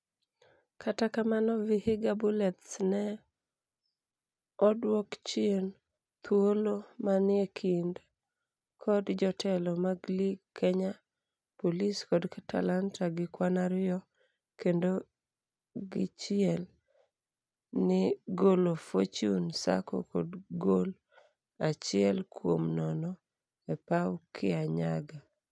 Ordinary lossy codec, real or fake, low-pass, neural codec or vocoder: none; real; none; none